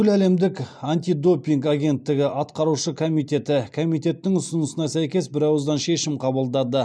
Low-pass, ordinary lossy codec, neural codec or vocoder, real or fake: none; none; none; real